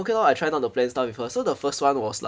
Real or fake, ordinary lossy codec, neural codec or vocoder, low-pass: real; none; none; none